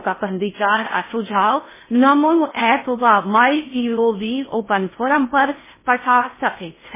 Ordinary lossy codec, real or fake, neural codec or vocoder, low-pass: MP3, 16 kbps; fake; codec, 16 kHz in and 24 kHz out, 0.6 kbps, FocalCodec, streaming, 4096 codes; 3.6 kHz